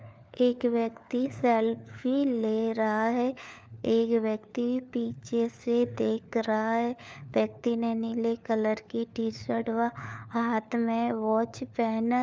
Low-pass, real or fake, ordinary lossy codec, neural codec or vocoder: none; fake; none; codec, 16 kHz, 4 kbps, FunCodec, trained on LibriTTS, 50 frames a second